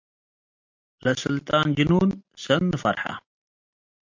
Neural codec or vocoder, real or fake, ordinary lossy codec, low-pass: none; real; MP3, 48 kbps; 7.2 kHz